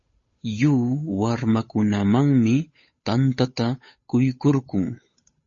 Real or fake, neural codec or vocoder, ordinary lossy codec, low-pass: fake; codec, 16 kHz, 8 kbps, FunCodec, trained on Chinese and English, 25 frames a second; MP3, 32 kbps; 7.2 kHz